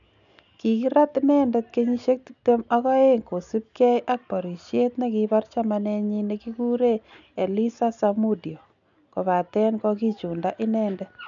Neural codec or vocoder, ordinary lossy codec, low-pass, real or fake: none; none; 7.2 kHz; real